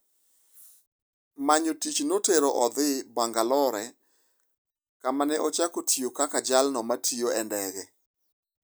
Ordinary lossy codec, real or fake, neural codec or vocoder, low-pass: none; real; none; none